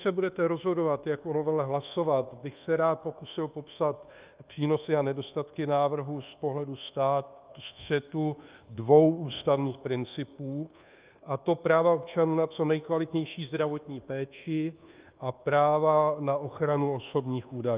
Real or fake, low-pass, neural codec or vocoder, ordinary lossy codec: fake; 3.6 kHz; codec, 24 kHz, 1.2 kbps, DualCodec; Opus, 32 kbps